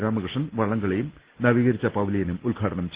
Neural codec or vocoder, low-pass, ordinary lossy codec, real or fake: none; 3.6 kHz; Opus, 16 kbps; real